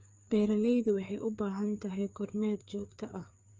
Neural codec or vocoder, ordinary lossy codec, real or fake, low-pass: codec, 16 kHz, 4 kbps, FreqCodec, larger model; Opus, 24 kbps; fake; 7.2 kHz